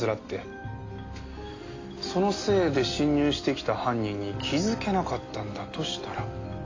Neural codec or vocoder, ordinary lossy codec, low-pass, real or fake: none; AAC, 32 kbps; 7.2 kHz; real